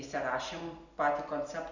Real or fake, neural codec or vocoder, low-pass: real; none; 7.2 kHz